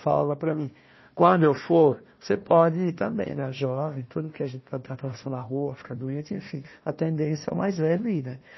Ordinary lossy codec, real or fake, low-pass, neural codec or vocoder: MP3, 24 kbps; fake; 7.2 kHz; codec, 16 kHz, 1 kbps, FunCodec, trained on Chinese and English, 50 frames a second